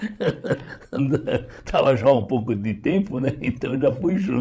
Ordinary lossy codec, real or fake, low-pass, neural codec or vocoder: none; fake; none; codec, 16 kHz, 16 kbps, FreqCodec, larger model